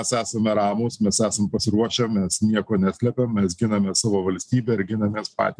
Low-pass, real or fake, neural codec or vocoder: 9.9 kHz; real; none